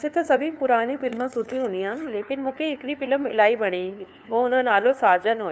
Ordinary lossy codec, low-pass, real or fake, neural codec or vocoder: none; none; fake; codec, 16 kHz, 2 kbps, FunCodec, trained on LibriTTS, 25 frames a second